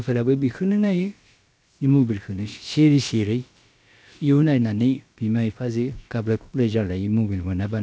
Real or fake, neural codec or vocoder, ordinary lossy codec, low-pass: fake; codec, 16 kHz, about 1 kbps, DyCAST, with the encoder's durations; none; none